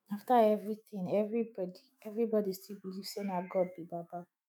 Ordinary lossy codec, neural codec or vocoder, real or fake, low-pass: none; autoencoder, 48 kHz, 128 numbers a frame, DAC-VAE, trained on Japanese speech; fake; none